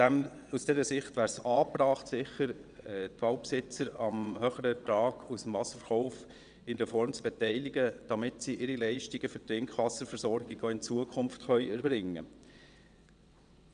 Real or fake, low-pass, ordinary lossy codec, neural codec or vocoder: fake; 9.9 kHz; none; vocoder, 22.05 kHz, 80 mel bands, WaveNeXt